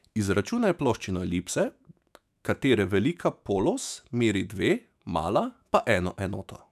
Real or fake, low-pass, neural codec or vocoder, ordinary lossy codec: fake; 14.4 kHz; autoencoder, 48 kHz, 128 numbers a frame, DAC-VAE, trained on Japanese speech; none